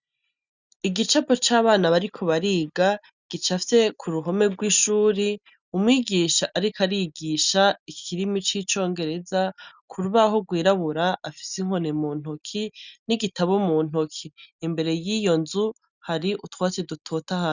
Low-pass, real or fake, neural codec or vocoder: 7.2 kHz; real; none